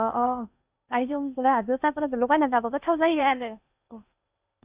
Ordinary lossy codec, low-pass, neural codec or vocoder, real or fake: Opus, 64 kbps; 3.6 kHz; codec, 16 kHz in and 24 kHz out, 0.8 kbps, FocalCodec, streaming, 65536 codes; fake